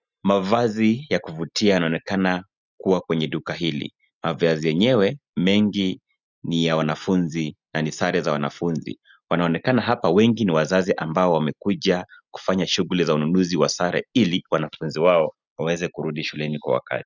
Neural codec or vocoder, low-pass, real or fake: none; 7.2 kHz; real